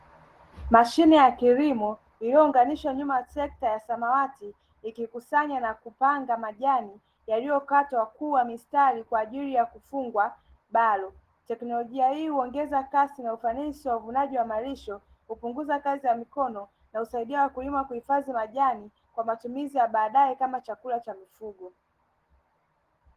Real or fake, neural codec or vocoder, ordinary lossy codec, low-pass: real; none; Opus, 16 kbps; 14.4 kHz